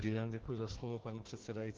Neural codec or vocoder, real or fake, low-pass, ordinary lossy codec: codec, 16 kHz, 1 kbps, FunCodec, trained on Chinese and English, 50 frames a second; fake; 7.2 kHz; Opus, 16 kbps